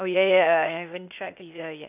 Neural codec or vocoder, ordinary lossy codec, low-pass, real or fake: codec, 16 kHz, 0.8 kbps, ZipCodec; none; 3.6 kHz; fake